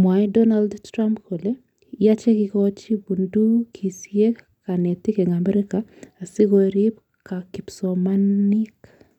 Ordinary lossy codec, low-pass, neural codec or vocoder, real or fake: none; 19.8 kHz; none; real